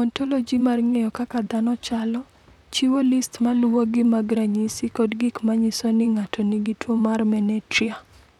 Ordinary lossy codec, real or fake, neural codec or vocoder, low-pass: none; fake; vocoder, 44.1 kHz, 128 mel bands, Pupu-Vocoder; 19.8 kHz